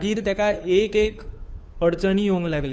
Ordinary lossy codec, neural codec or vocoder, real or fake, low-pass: none; codec, 16 kHz, 2 kbps, FunCodec, trained on Chinese and English, 25 frames a second; fake; none